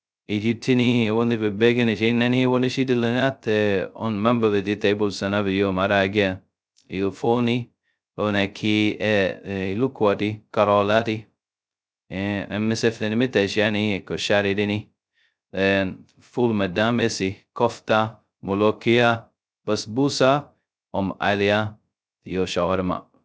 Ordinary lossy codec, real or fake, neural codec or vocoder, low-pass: none; fake; codec, 16 kHz, 0.2 kbps, FocalCodec; none